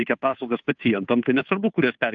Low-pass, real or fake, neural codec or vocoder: 7.2 kHz; fake; codec, 16 kHz, 2 kbps, FunCodec, trained on Chinese and English, 25 frames a second